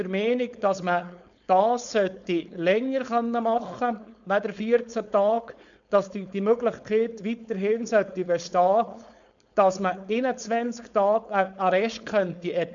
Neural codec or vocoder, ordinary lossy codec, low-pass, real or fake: codec, 16 kHz, 4.8 kbps, FACodec; none; 7.2 kHz; fake